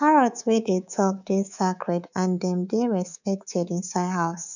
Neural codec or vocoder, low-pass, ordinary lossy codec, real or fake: codec, 24 kHz, 3.1 kbps, DualCodec; 7.2 kHz; none; fake